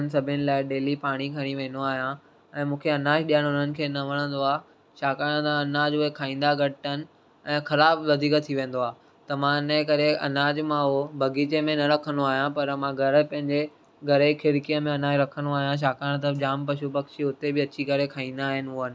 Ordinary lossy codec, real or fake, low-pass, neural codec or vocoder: none; real; none; none